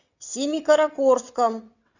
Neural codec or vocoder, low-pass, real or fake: vocoder, 22.05 kHz, 80 mel bands, WaveNeXt; 7.2 kHz; fake